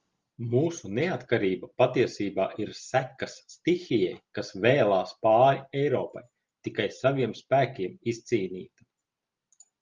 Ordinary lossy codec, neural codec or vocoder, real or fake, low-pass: Opus, 32 kbps; none; real; 7.2 kHz